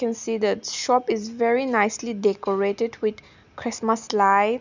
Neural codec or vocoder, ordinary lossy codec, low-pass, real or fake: none; none; 7.2 kHz; real